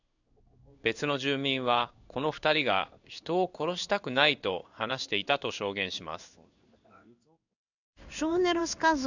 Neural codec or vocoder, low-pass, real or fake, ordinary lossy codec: codec, 16 kHz in and 24 kHz out, 1 kbps, XY-Tokenizer; 7.2 kHz; fake; none